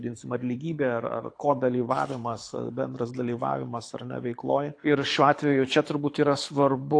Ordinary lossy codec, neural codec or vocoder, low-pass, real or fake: AAC, 48 kbps; codec, 24 kHz, 6 kbps, HILCodec; 9.9 kHz; fake